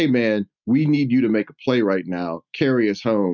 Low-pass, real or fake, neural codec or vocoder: 7.2 kHz; real; none